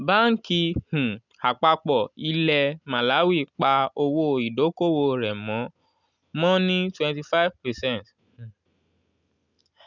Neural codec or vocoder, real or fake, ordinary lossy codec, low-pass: none; real; none; 7.2 kHz